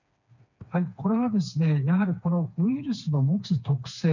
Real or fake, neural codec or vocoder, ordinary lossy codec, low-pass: fake; codec, 16 kHz, 4 kbps, FreqCodec, smaller model; none; 7.2 kHz